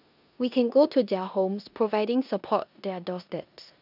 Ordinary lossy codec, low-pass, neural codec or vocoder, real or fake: none; 5.4 kHz; codec, 16 kHz in and 24 kHz out, 0.9 kbps, LongCat-Audio-Codec, four codebook decoder; fake